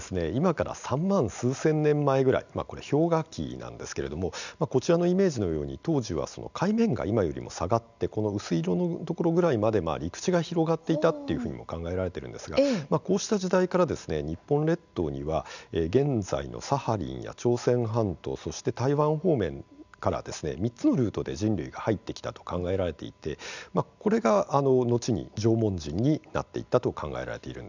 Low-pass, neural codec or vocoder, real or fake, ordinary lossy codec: 7.2 kHz; none; real; none